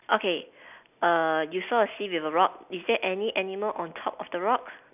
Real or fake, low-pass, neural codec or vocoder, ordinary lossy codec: real; 3.6 kHz; none; none